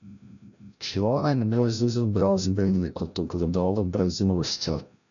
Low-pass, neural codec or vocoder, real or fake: 7.2 kHz; codec, 16 kHz, 0.5 kbps, FreqCodec, larger model; fake